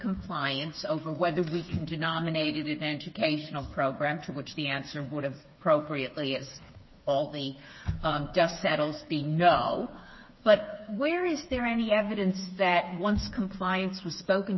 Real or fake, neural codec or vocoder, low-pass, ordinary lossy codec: fake; codec, 16 kHz, 4 kbps, FreqCodec, smaller model; 7.2 kHz; MP3, 24 kbps